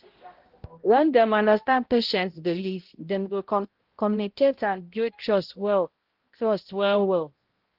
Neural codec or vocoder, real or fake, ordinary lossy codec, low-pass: codec, 16 kHz, 0.5 kbps, X-Codec, HuBERT features, trained on balanced general audio; fake; Opus, 16 kbps; 5.4 kHz